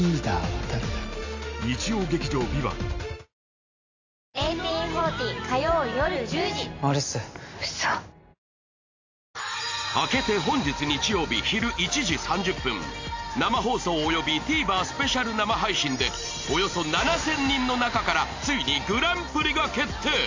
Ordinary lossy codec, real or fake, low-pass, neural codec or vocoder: AAC, 48 kbps; real; 7.2 kHz; none